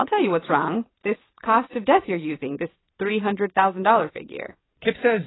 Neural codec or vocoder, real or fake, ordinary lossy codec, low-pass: vocoder, 22.05 kHz, 80 mel bands, WaveNeXt; fake; AAC, 16 kbps; 7.2 kHz